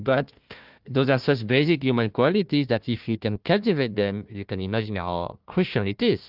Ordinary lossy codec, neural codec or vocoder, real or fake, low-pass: Opus, 32 kbps; codec, 16 kHz, 1 kbps, FunCodec, trained on Chinese and English, 50 frames a second; fake; 5.4 kHz